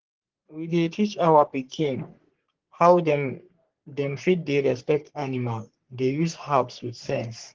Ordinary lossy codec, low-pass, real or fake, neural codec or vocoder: Opus, 16 kbps; 7.2 kHz; fake; codec, 44.1 kHz, 3.4 kbps, Pupu-Codec